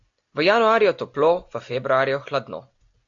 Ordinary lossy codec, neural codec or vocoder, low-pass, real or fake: AAC, 64 kbps; none; 7.2 kHz; real